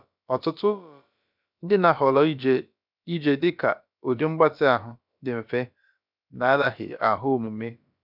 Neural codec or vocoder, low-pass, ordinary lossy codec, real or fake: codec, 16 kHz, about 1 kbps, DyCAST, with the encoder's durations; 5.4 kHz; none; fake